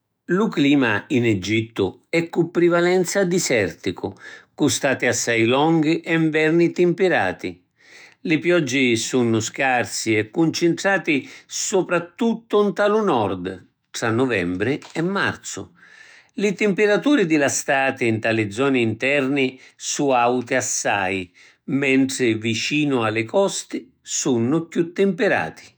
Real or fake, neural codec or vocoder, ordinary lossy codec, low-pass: fake; autoencoder, 48 kHz, 128 numbers a frame, DAC-VAE, trained on Japanese speech; none; none